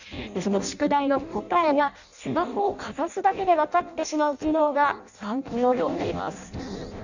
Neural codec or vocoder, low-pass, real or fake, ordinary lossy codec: codec, 16 kHz in and 24 kHz out, 0.6 kbps, FireRedTTS-2 codec; 7.2 kHz; fake; none